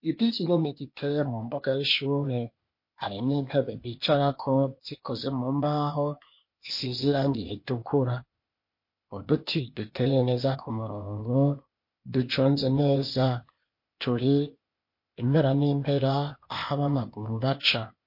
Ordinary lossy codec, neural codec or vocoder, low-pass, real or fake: MP3, 32 kbps; codec, 16 kHz, 0.8 kbps, ZipCodec; 5.4 kHz; fake